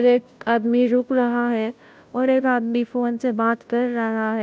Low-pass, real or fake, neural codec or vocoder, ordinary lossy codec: none; fake; codec, 16 kHz, 0.5 kbps, FunCodec, trained on Chinese and English, 25 frames a second; none